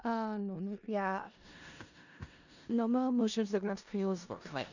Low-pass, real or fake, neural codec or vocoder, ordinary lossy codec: 7.2 kHz; fake; codec, 16 kHz in and 24 kHz out, 0.4 kbps, LongCat-Audio-Codec, four codebook decoder; none